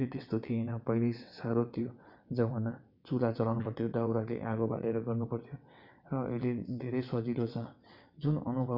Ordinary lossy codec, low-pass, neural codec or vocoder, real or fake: none; 5.4 kHz; vocoder, 22.05 kHz, 80 mel bands, WaveNeXt; fake